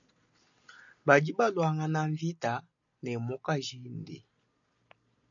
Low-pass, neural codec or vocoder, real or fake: 7.2 kHz; none; real